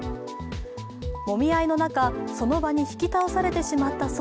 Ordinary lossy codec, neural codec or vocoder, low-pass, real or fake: none; none; none; real